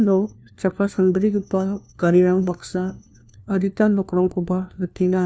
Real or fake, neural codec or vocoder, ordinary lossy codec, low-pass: fake; codec, 16 kHz, 1 kbps, FunCodec, trained on LibriTTS, 50 frames a second; none; none